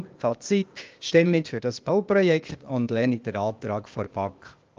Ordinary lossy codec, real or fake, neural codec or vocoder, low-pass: Opus, 24 kbps; fake; codec, 16 kHz, 0.8 kbps, ZipCodec; 7.2 kHz